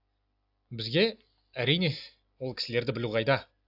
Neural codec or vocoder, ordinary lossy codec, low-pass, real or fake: none; AAC, 48 kbps; 5.4 kHz; real